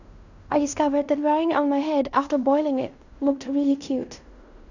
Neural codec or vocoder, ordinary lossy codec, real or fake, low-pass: codec, 16 kHz in and 24 kHz out, 0.9 kbps, LongCat-Audio-Codec, fine tuned four codebook decoder; none; fake; 7.2 kHz